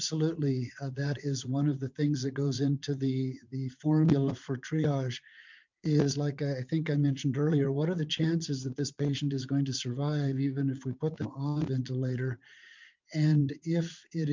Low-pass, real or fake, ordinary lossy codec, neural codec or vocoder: 7.2 kHz; fake; MP3, 64 kbps; vocoder, 44.1 kHz, 80 mel bands, Vocos